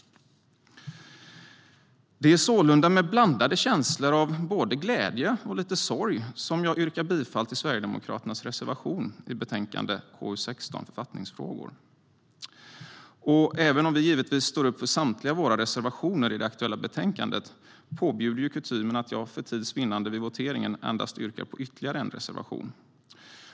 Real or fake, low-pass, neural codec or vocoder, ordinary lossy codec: real; none; none; none